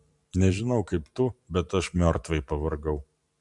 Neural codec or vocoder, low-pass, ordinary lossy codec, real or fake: vocoder, 44.1 kHz, 128 mel bands every 512 samples, BigVGAN v2; 10.8 kHz; MP3, 96 kbps; fake